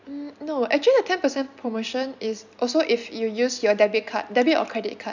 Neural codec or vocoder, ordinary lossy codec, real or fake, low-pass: none; none; real; 7.2 kHz